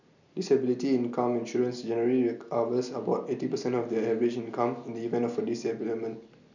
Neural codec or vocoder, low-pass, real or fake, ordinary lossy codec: none; 7.2 kHz; real; none